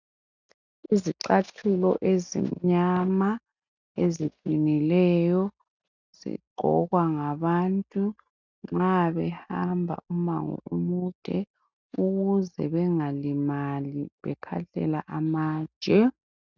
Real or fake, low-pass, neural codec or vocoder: real; 7.2 kHz; none